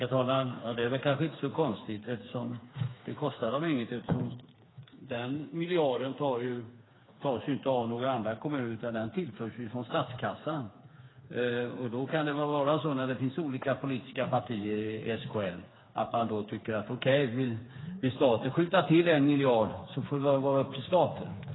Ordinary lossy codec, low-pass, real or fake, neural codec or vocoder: AAC, 16 kbps; 7.2 kHz; fake; codec, 16 kHz, 4 kbps, FreqCodec, smaller model